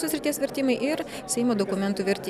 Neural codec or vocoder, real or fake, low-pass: none; real; 14.4 kHz